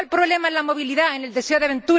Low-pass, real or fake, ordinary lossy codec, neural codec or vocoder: none; real; none; none